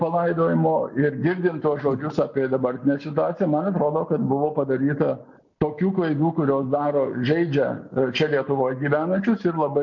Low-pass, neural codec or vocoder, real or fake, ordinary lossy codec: 7.2 kHz; none; real; MP3, 64 kbps